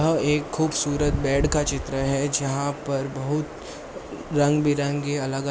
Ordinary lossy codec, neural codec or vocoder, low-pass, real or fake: none; none; none; real